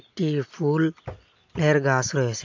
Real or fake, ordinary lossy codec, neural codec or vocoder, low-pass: real; AAC, 48 kbps; none; 7.2 kHz